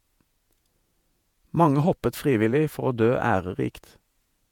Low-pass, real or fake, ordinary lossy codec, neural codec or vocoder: 19.8 kHz; fake; MP3, 96 kbps; vocoder, 48 kHz, 128 mel bands, Vocos